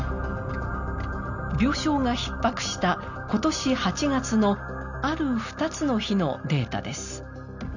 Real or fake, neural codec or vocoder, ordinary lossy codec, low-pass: real; none; none; 7.2 kHz